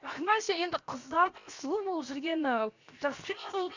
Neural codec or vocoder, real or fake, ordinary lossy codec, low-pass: codec, 16 kHz, 0.7 kbps, FocalCodec; fake; Opus, 64 kbps; 7.2 kHz